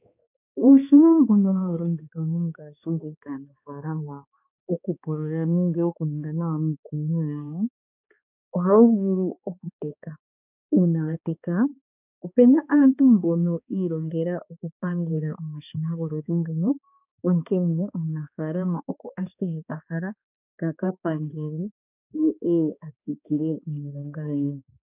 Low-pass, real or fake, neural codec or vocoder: 3.6 kHz; fake; codec, 16 kHz, 2 kbps, X-Codec, HuBERT features, trained on balanced general audio